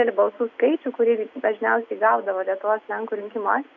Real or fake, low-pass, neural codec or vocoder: real; 7.2 kHz; none